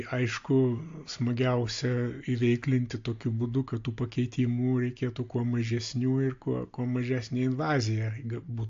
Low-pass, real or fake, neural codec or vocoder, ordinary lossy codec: 7.2 kHz; real; none; AAC, 48 kbps